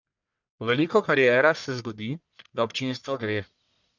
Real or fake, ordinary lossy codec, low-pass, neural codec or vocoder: fake; none; 7.2 kHz; codec, 44.1 kHz, 1.7 kbps, Pupu-Codec